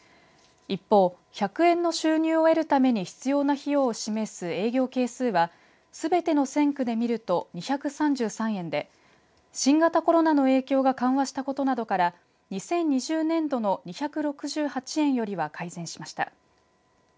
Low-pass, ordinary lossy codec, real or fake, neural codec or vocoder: none; none; real; none